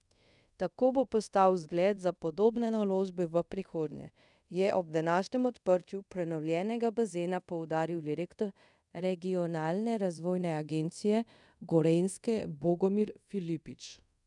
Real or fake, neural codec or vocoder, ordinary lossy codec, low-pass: fake; codec, 24 kHz, 0.5 kbps, DualCodec; none; 10.8 kHz